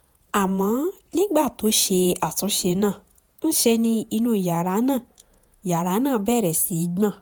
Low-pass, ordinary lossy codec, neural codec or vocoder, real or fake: none; none; vocoder, 48 kHz, 128 mel bands, Vocos; fake